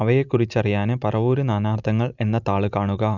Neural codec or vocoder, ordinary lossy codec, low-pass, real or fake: none; none; 7.2 kHz; real